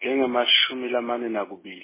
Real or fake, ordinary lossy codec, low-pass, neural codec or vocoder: real; MP3, 16 kbps; 3.6 kHz; none